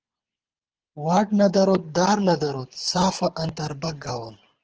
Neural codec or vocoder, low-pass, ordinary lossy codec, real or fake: codec, 24 kHz, 6 kbps, HILCodec; 7.2 kHz; Opus, 16 kbps; fake